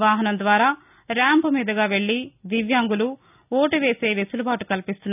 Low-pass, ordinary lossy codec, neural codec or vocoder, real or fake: 3.6 kHz; none; none; real